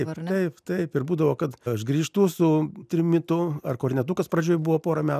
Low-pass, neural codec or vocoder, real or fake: 14.4 kHz; none; real